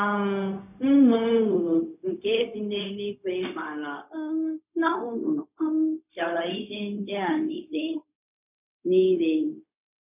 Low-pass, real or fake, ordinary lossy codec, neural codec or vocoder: 3.6 kHz; fake; none; codec, 16 kHz, 0.4 kbps, LongCat-Audio-Codec